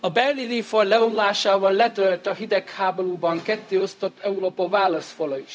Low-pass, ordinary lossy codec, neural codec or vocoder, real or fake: none; none; codec, 16 kHz, 0.4 kbps, LongCat-Audio-Codec; fake